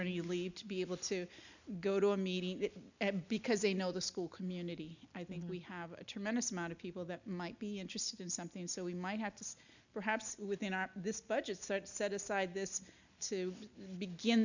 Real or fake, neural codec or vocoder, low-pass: real; none; 7.2 kHz